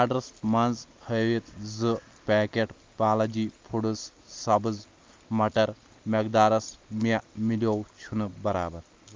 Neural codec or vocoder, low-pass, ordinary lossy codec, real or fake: none; 7.2 kHz; Opus, 32 kbps; real